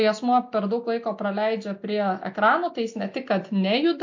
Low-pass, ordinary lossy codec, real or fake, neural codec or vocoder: 7.2 kHz; MP3, 48 kbps; real; none